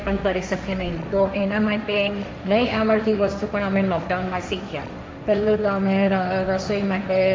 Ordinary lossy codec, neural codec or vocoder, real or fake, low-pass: none; codec, 16 kHz, 1.1 kbps, Voila-Tokenizer; fake; none